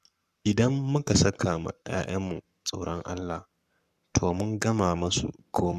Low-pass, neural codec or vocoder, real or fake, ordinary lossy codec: 14.4 kHz; codec, 44.1 kHz, 7.8 kbps, Pupu-Codec; fake; none